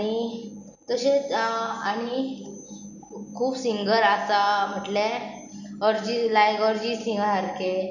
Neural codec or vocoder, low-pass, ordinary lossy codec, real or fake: none; 7.2 kHz; none; real